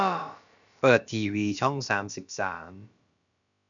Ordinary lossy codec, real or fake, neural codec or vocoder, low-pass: none; fake; codec, 16 kHz, about 1 kbps, DyCAST, with the encoder's durations; 7.2 kHz